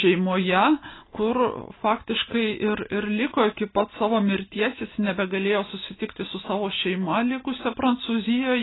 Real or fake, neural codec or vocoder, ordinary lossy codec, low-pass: real; none; AAC, 16 kbps; 7.2 kHz